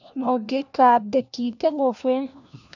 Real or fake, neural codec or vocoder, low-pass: fake; codec, 16 kHz, 1 kbps, FunCodec, trained on LibriTTS, 50 frames a second; 7.2 kHz